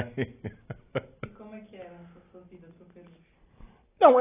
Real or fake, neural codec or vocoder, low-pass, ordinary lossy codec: real; none; 3.6 kHz; AAC, 24 kbps